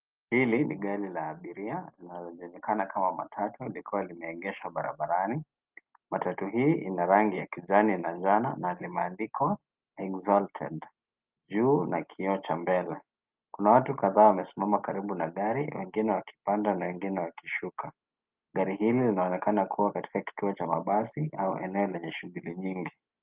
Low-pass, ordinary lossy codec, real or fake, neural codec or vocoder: 3.6 kHz; Opus, 32 kbps; real; none